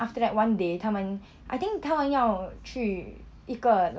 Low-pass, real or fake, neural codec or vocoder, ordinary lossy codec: none; real; none; none